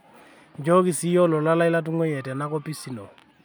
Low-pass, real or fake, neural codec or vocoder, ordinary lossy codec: none; real; none; none